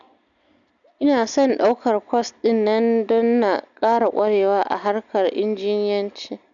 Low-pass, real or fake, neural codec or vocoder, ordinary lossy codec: 7.2 kHz; real; none; MP3, 96 kbps